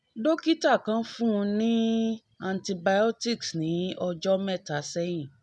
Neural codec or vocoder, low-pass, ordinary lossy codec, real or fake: none; none; none; real